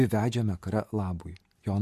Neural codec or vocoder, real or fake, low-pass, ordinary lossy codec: none; real; 14.4 kHz; MP3, 64 kbps